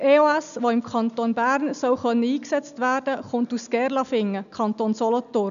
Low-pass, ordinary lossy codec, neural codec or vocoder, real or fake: 7.2 kHz; none; none; real